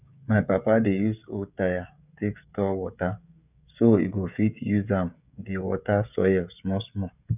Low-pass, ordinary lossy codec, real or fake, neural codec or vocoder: 3.6 kHz; none; fake; codec, 16 kHz, 16 kbps, FreqCodec, smaller model